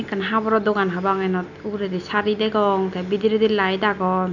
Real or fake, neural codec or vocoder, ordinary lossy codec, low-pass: real; none; none; 7.2 kHz